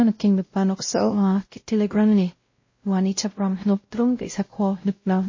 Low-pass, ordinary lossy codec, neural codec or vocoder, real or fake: 7.2 kHz; MP3, 32 kbps; codec, 16 kHz, 0.5 kbps, X-Codec, WavLM features, trained on Multilingual LibriSpeech; fake